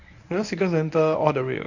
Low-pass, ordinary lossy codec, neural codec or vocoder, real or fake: 7.2 kHz; none; codec, 24 kHz, 0.9 kbps, WavTokenizer, medium speech release version 1; fake